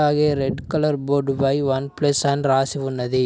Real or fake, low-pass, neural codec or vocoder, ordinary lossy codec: real; none; none; none